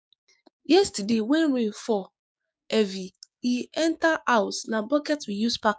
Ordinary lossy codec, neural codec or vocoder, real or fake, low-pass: none; codec, 16 kHz, 6 kbps, DAC; fake; none